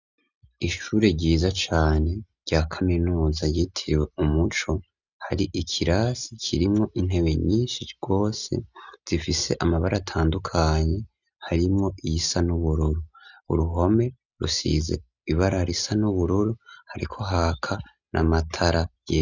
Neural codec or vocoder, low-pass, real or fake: none; 7.2 kHz; real